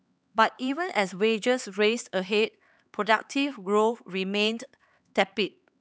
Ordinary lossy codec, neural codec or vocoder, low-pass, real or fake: none; codec, 16 kHz, 4 kbps, X-Codec, HuBERT features, trained on LibriSpeech; none; fake